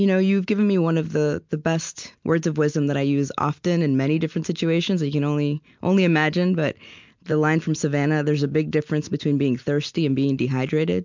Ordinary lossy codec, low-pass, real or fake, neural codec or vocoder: MP3, 64 kbps; 7.2 kHz; real; none